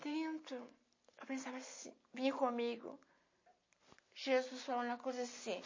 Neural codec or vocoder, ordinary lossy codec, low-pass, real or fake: none; MP3, 32 kbps; 7.2 kHz; real